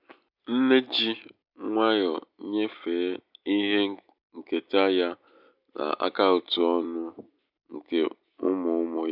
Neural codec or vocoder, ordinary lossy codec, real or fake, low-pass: none; none; real; 5.4 kHz